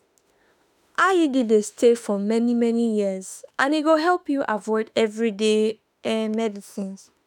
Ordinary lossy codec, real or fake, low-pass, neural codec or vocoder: none; fake; 19.8 kHz; autoencoder, 48 kHz, 32 numbers a frame, DAC-VAE, trained on Japanese speech